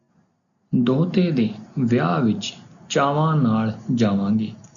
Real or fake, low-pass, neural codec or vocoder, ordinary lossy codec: real; 7.2 kHz; none; MP3, 96 kbps